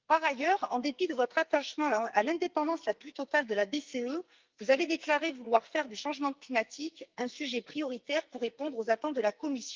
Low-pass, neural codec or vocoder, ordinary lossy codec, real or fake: 7.2 kHz; codec, 44.1 kHz, 2.6 kbps, SNAC; Opus, 24 kbps; fake